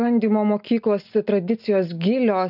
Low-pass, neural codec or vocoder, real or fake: 5.4 kHz; none; real